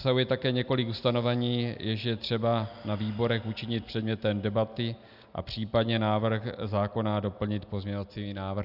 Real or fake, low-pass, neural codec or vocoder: real; 5.4 kHz; none